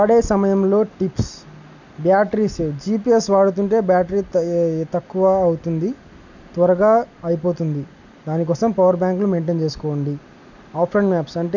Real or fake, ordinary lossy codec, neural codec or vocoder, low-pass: real; none; none; 7.2 kHz